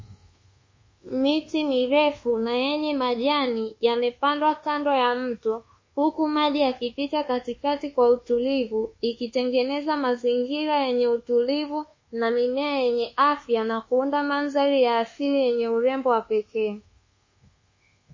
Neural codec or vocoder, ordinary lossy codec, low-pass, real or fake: codec, 24 kHz, 1.2 kbps, DualCodec; MP3, 32 kbps; 7.2 kHz; fake